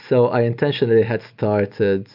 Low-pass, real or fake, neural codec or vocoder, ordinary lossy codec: 5.4 kHz; real; none; MP3, 48 kbps